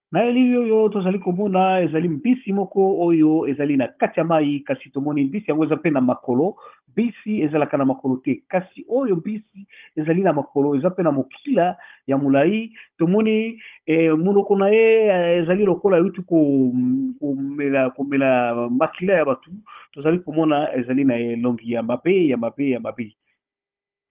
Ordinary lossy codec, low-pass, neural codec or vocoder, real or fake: Opus, 24 kbps; 3.6 kHz; codec, 16 kHz, 16 kbps, FunCodec, trained on Chinese and English, 50 frames a second; fake